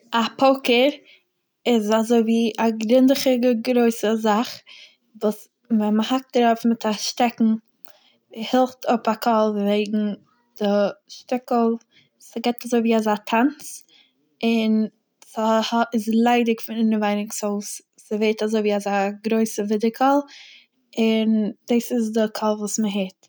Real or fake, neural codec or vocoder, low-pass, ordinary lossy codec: real; none; none; none